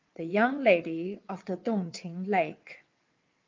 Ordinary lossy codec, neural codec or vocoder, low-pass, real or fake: Opus, 24 kbps; vocoder, 22.05 kHz, 80 mel bands, WaveNeXt; 7.2 kHz; fake